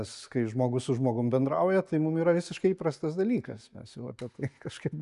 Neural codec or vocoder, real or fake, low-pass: none; real; 10.8 kHz